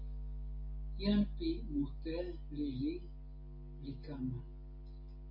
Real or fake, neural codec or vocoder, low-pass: real; none; 5.4 kHz